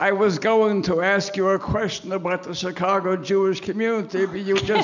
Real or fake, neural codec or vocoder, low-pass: real; none; 7.2 kHz